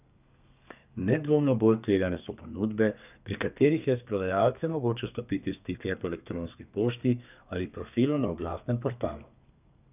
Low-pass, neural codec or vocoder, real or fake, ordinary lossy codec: 3.6 kHz; codec, 32 kHz, 1.9 kbps, SNAC; fake; none